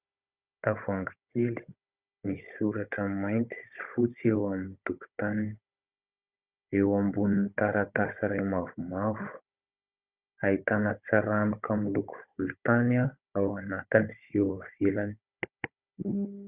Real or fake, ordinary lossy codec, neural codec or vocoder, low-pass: fake; Opus, 64 kbps; codec, 16 kHz, 16 kbps, FunCodec, trained on Chinese and English, 50 frames a second; 3.6 kHz